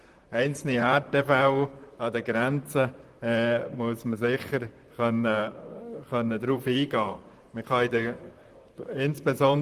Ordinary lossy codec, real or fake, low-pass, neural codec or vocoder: Opus, 24 kbps; fake; 14.4 kHz; vocoder, 44.1 kHz, 128 mel bands, Pupu-Vocoder